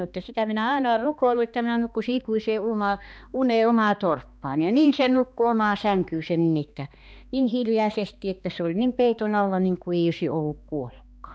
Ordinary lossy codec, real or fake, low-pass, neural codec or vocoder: none; fake; none; codec, 16 kHz, 2 kbps, X-Codec, HuBERT features, trained on balanced general audio